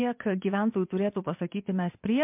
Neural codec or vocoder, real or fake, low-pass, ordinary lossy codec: vocoder, 22.05 kHz, 80 mel bands, WaveNeXt; fake; 3.6 kHz; MP3, 32 kbps